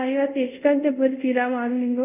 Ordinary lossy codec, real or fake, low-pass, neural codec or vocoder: none; fake; 3.6 kHz; codec, 24 kHz, 0.5 kbps, DualCodec